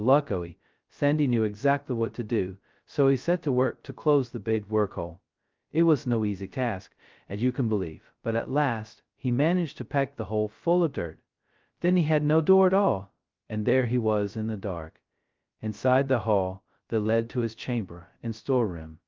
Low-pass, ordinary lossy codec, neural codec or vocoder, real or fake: 7.2 kHz; Opus, 24 kbps; codec, 16 kHz, 0.2 kbps, FocalCodec; fake